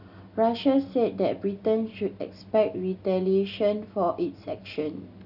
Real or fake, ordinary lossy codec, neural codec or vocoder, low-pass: real; none; none; 5.4 kHz